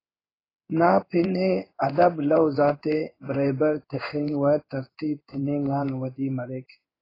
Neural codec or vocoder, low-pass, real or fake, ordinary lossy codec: codec, 16 kHz in and 24 kHz out, 1 kbps, XY-Tokenizer; 5.4 kHz; fake; AAC, 24 kbps